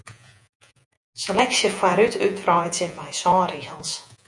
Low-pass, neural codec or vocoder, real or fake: 10.8 kHz; vocoder, 48 kHz, 128 mel bands, Vocos; fake